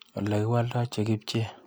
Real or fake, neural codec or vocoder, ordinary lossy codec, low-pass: real; none; none; none